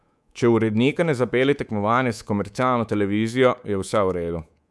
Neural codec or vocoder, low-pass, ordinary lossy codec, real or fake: codec, 24 kHz, 3.1 kbps, DualCodec; none; none; fake